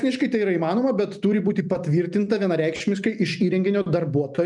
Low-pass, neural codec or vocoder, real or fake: 10.8 kHz; none; real